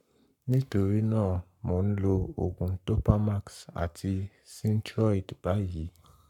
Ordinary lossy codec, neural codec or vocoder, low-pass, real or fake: none; codec, 44.1 kHz, 7.8 kbps, Pupu-Codec; 19.8 kHz; fake